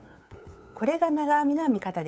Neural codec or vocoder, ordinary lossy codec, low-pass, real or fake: codec, 16 kHz, 8 kbps, FunCodec, trained on LibriTTS, 25 frames a second; none; none; fake